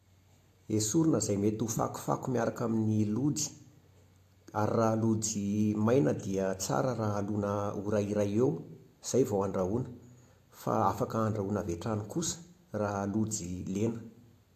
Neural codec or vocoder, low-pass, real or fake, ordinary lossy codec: none; 14.4 kHz; real; AAC, 64 kbps